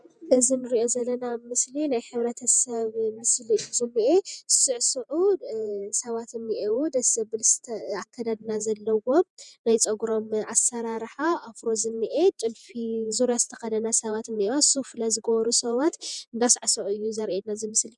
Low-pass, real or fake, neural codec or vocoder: 10.8 kHz; fake; vocoder, 48 kHz, 128 mel bands, Vocos